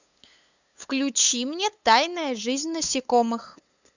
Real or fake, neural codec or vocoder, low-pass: fake; codec, 16 kHz, 8 kbps, FunCodec, trained on LibriTTS, 25 frames a second; 7.2 kHz